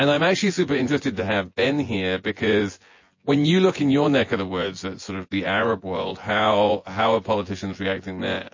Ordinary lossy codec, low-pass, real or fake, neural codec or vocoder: MP3, 32 kbps; 7.2 kHz; fake; vocoder, 24 kHz, 100 mel bands, Vocos